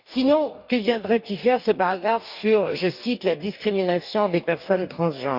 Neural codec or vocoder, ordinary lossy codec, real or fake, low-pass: codec, 44.1 kHz, 2.6 kbps, DAC; none; fake; 5.4 kHz